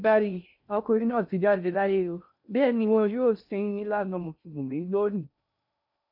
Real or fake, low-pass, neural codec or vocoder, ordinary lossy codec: fake; 5.4 kHz; codec, 16 kHz in and 24 kHz out, 0.6 kbps, FocalCodec, streaming, 2048 codes; none